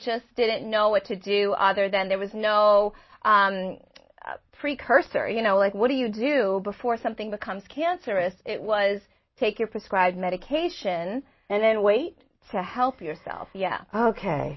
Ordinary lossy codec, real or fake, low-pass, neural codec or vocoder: MP3, 24 kbps; real; 7.2 kHz; none